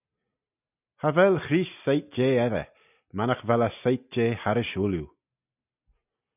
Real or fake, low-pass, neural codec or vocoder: real; 3.6 kHz; none